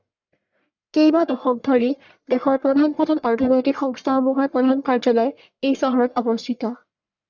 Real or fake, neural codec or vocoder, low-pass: fake; codec, 44.1 kHz, 1.7 kbps, Pupu-Codec; 7.2 kHz